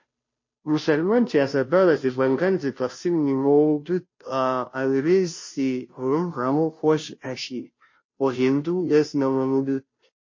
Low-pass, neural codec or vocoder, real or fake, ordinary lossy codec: 7.2 kHz; codec, 16 kHz, 0.5 kbps, FunCodec, trained on Chinese and English, 25 frames a second; fake; MP3, 32 kbps